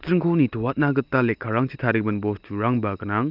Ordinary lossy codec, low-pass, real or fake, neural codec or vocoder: Opus, 24 kbps; 5.4 kHz; real; none